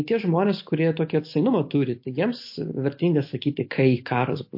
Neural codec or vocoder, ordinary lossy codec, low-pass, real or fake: none; MP3, 32 kbps; 5.4 kHz; real